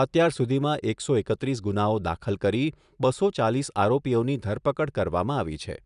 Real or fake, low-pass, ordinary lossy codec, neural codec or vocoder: real; 10.8 kHz; none; none